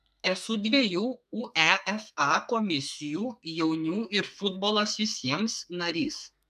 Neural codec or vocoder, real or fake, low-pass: codec, 32 kHz, 1.9 kbps, SNAC; fake; 14.4 kHz